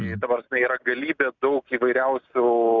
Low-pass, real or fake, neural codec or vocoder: 7.2 kHz; real; none